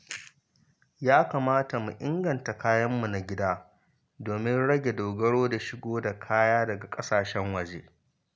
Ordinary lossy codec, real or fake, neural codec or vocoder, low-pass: none; real; none; none